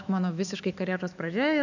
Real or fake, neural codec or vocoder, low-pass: fake; autoencoder, 48 kHz, 128 numbers a frame, DAC-VAE, trained on Japanese speech; 7.2 kHz